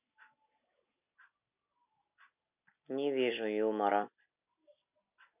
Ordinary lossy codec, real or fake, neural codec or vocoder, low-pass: none; real; none; 3.6 kHz